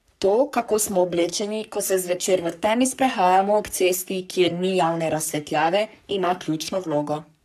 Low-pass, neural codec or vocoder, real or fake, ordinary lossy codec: 14.4 kHz; codec, 44.1 kHz, 3.4 kbps, Pupu-Codec; fake; none